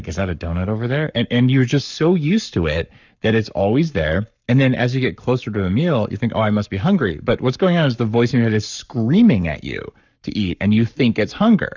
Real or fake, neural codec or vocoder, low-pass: fake; codec, 44.1 kHz, 7.8 kbps, Pupu-Codec; 7.2 kHz